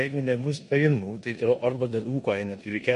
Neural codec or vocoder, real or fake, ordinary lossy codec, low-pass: codec, 16 kHz in and 24 kHz out, 0.9 kbps, LongCat-Audio-Codec, four codebook decoder; fake; MP3, 48 kbps; 10.8 kHz